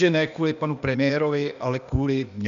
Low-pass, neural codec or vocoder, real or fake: 7.2 kHz; codec, 16 kHz, 0.8 kbps, ZipCodec; fake